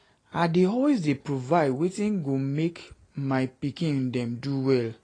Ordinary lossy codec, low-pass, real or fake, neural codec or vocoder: AAC, 32 kbps; 9.9 kHz; real; none